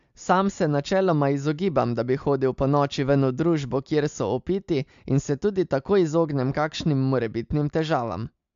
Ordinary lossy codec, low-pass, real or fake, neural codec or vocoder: MP3, 64 kbps; 7.2 kHz; real; none